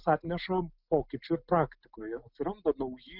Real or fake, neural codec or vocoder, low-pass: real; none; 5.4 kHz